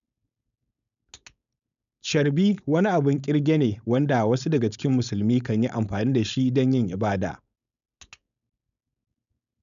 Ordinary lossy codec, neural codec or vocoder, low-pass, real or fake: none; codec, 16 kHz, 4.8 kbps, FACodec; 7.2 kHz; fake